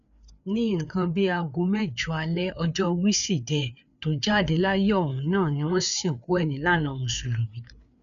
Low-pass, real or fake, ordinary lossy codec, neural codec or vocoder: 7.2 kHz; fake; none; codec, 16 kHz, 4 kbps, FreqCodec, larger model